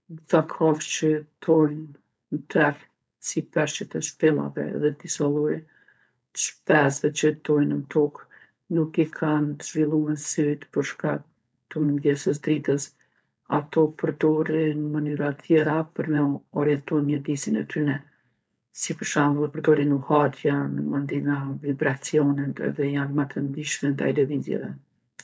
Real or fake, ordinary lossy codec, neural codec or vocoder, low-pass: fake; none; codec, 16 kHz, 4.8 kbps, FACodec; none